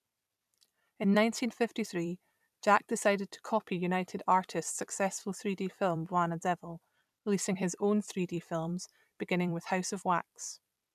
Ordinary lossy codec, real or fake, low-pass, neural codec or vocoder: none; fake; 14.4 kHz; vocoder, 44.1 kHz, 128 mel bands every 256 samples, BigVGAN v2